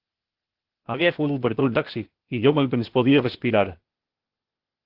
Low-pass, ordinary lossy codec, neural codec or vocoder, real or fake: 5.4 kHz; Opus, 16 kbps; codec, 16 kHz, 0.8 kbps, ZipCodec; fake